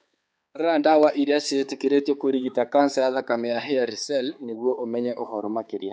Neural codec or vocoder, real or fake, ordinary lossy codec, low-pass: codec, 16 kHz, 4 kbps, X-Codec, HuBERT features, trained on balanced general audio; fake; none; none